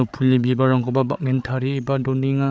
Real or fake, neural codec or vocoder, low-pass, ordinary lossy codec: fake; codec, 16 kHz, 8 kbps, FreqCodec, larger model; none; none